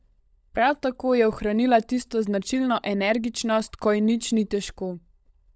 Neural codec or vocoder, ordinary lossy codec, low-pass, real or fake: codec, 16 kHz, 16 kbps, FunCodec, trained on LibriTTS, 50 frames a second; none; none; fake